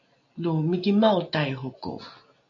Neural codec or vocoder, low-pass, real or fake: none; 7.2 kHz; real